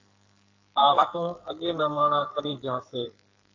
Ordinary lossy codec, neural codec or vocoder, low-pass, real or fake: AAC, 48 kbps; codec, 44.1 kHz, 2.6 kbps, SNAC; 7.2 kHz; fake